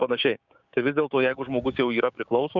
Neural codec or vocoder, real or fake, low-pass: codec, 16 kHz, 6 kbps, DAC; fake; 7.2 kHz